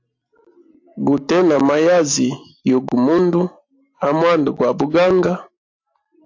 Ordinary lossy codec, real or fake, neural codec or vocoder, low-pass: AAC, 48 kbps; real; none; 7.2 kHz